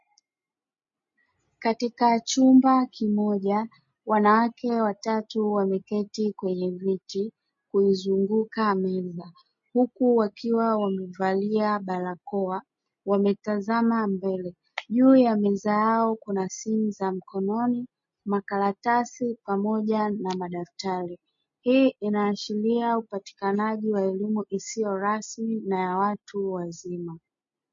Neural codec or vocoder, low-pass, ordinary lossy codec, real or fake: none; 7.2 kHz; MP3, 32 kbps; real